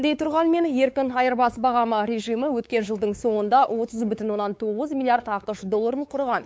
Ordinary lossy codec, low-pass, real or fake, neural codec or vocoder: none; none; fake; codec, 16 kHz, 4 kbps, X-Codec, WavLM features, trained on Multilingual LibriSpeech